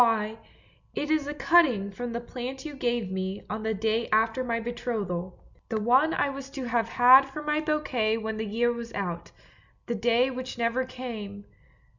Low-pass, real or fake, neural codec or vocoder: 7.2 kHz; real; none